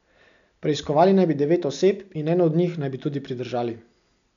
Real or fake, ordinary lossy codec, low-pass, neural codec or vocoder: real; none; 7.2 kHz; none